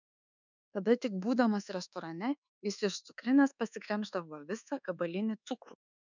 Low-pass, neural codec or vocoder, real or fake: 7.2 kHz; codec, 24 kHz, 1.2 kbps, DualCodec; fake